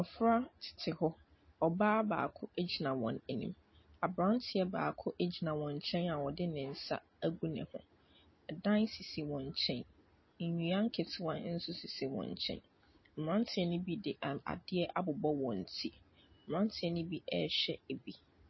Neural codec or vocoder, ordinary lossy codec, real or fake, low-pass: none; MP3, 24 kbps; real; 7.2 kHz